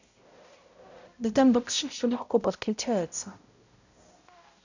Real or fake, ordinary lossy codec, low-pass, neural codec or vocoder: fake; none; 7.2 kHz; codec, 16 kHz, 0.5 kbps, X-Codec, HuBERT features, trained on balanced general audio